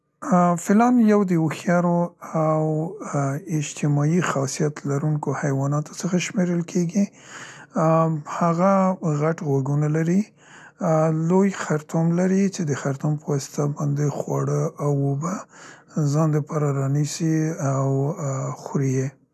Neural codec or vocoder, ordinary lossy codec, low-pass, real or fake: none; none; none; real